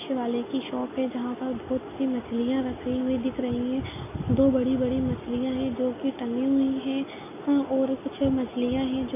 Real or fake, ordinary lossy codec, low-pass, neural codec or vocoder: real; none; 3.6 kHz; none